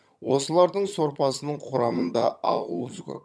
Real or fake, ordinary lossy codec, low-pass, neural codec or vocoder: fake; none; none; vocoder, 22.05 kHz, 80 mel bands, HiFi-GAN